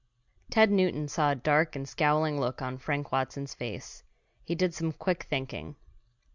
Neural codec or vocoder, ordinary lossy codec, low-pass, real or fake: none; Opus, 64 kbps; 7.2 kHz; real